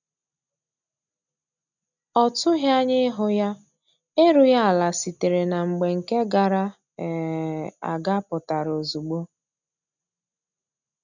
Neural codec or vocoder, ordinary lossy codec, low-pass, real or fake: none; none; 7.2 kHz; real